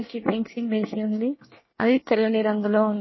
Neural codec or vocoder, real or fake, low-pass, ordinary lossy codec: codec, 24 kHz, 1 kbps, SNAC; fake; 7.2 kHz; MP3, 24 kbps